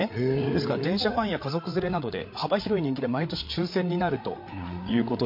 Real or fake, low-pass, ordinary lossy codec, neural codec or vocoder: fake; 5.4 kHz; MP3, 32 kbps; codec, 16 kHz, 8 kbps, FreqCodec, larger model